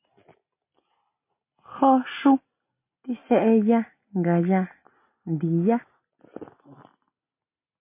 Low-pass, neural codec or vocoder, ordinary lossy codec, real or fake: 3.6 kHz; none; MP3, 24 kbps; real